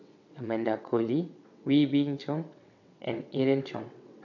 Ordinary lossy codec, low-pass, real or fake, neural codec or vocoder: none; 7.2 kHz; fake; vocoder, 22.05 kHz, 80 mel bands, WaveNeXt